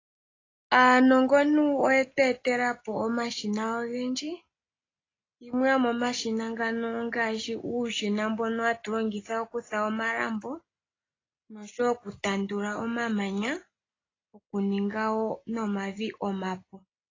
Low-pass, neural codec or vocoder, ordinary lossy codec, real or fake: 7.2 kHz; none; AAC, 32 kbps; real